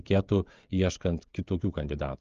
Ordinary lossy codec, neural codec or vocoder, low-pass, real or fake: Opus, 32 kbps; codec, 16 kHz, 16 kbps, FreqCodec, smaller model; 7.2 kHz; fake